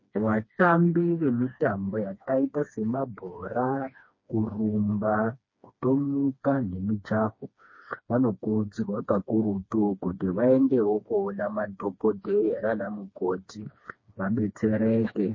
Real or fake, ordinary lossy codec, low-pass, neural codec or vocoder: fake; MP3, 32 kbps; 7.2 kHz; codec, 16 kHz, 2 kbps, FreqCodec, smaller model